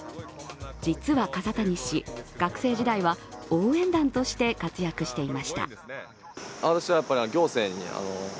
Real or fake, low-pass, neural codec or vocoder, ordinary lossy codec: real; none; none; none